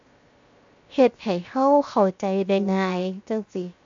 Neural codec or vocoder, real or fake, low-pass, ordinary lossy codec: codec, 16 kHz, 0.7 kbps, FocalCodec; fake; 7.2 kHz; MP3, 48 kbps